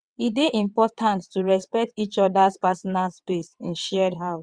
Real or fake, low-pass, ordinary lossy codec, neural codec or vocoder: fake; 9.9 kHz; Opus, 64 kbps; vocoder, 22.05 kHz, 80 mel bands, WaveNeXt